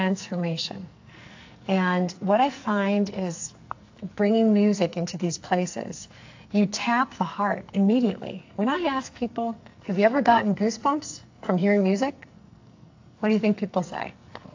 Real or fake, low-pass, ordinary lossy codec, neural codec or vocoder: fake; 7.2 kHz; AAC, 48 kbps; codec, 44.1 kHz, 2.6 kbps, SNAC